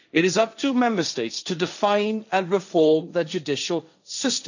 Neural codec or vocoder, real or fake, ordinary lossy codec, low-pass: codec, 16 kHz, 1.1 kbps, Voila-Tokenizer; fake; none; 7.2 kHz